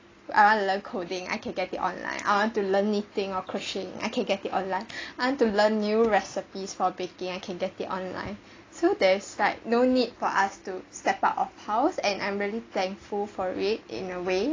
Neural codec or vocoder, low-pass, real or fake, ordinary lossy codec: none; 7.2 kHz; real; AAC, 32 kbps